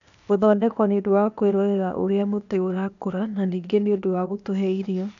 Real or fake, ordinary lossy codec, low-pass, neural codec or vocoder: fake; none; 7.2 kHz; codec, 16 kHz, 0.8 kbps, ZipCodec